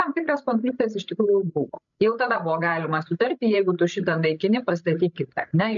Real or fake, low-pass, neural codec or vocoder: fake; 7.2 kHz; codec, 16 kHz, 16 kbps, FreqCodec, larger model